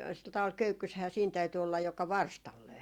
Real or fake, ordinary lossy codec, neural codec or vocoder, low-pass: real; Opus, 64 kbps; none; 19.8 kHz